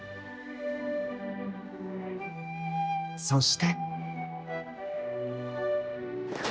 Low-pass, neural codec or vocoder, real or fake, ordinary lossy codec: none; codec, 16 kHz, 1 kbps, X-Codec, HuBERT features, trained on general audio; fake; none